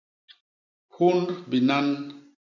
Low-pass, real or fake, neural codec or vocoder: 7.2 kHz; real; none